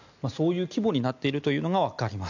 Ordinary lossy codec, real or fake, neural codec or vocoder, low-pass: none; real; none; 7.2 kHz